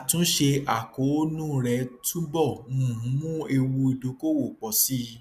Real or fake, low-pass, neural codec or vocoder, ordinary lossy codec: real; 14.4 kHz; none; none